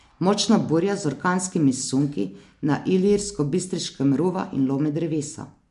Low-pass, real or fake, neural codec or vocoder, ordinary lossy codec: 10.8 kHz; real; none; MP3, 64 kbps